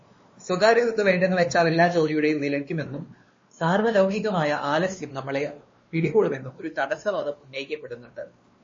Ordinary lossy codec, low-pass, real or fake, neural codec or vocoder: MP3, 32 kbps; 7.2 kHz; fake; codec, 16 kHz, 2 kbps, X-Codec, WavLM features, trained on Multilingual LibriSpeech